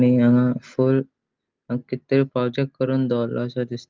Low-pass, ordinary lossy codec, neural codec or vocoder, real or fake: 7.2 kHz; Opus, 24 kbps; none; real